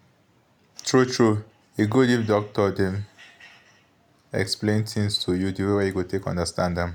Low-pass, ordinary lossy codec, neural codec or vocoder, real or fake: 19.8 kHz; none; none; real